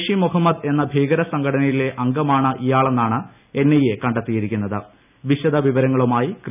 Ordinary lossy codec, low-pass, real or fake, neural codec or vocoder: none; 3.6 kHz; real; none